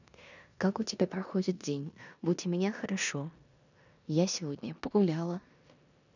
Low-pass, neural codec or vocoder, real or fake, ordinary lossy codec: 7.2 kHz; codec, 16 kHz in and 24 kHz out, 0.9 kbps, LongCat-Audio-Codec, four codebook decoder; fake; none